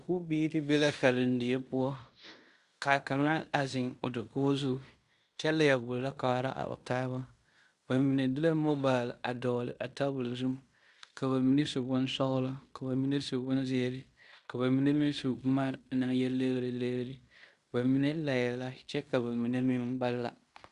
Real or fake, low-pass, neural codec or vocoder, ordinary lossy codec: fake; 10.8 kHz; codec, 16 kHz in and 24 kHz out, 0.9 kbps, LongCat-Audio-Codec, fine tuned four codebook decoder; Opus, 64 kbps